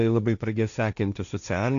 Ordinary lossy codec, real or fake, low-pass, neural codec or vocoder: AAC, 96 kbps; fake; 7.2 kHz; codec, 16 kHz, 1.1 kbps, Voila-Tokenizer